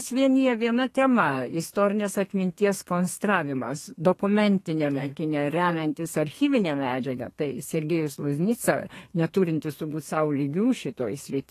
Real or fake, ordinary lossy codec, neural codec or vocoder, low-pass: fake; AAC, 48 kbps; codec, 32 kHz, 1.9 kbps, SNAC; 14.4 kHz